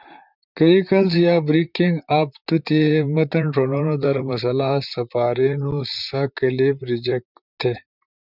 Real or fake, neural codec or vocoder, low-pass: fake; vocoder, 44.1 kHz, 128 mel bands, Pupu-Vocoder; 5.4 kHz